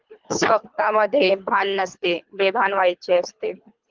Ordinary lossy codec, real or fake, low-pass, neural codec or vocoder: Opus, 24 kbps; fake; 7.2 kHz; codec, 24 kHz, 3 kbps, HILCodec